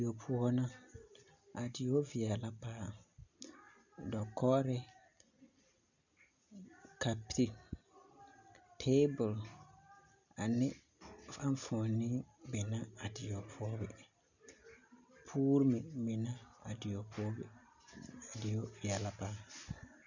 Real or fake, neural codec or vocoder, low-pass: real; none; 7.2 kHz